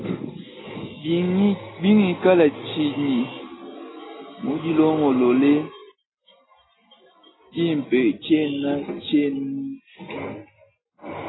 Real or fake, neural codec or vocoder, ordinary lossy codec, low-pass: real; none; AAC, 16 kbps; 7.2 kHz